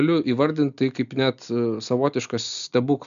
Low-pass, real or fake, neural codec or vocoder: 7.2 kHz; real; none